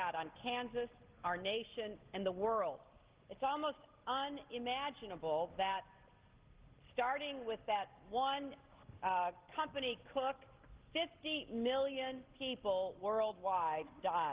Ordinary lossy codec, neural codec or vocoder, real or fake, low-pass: Opus, 16 kbps; none; real; 3.6 kHz